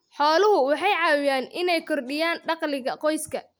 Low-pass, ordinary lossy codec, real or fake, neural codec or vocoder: none; none; real; none